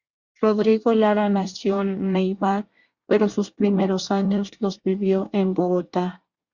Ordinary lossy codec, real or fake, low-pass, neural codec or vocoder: Opus, 64 kbps; fake; 7.2 kHz; codec, 24 kHz, 1 kbps, SNAC